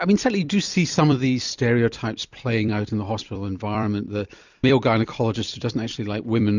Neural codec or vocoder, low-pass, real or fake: vocoder, 44.1 kHz, 128 mel bands every 256 samples, BigVGAN v2; 7.2 kHz; fake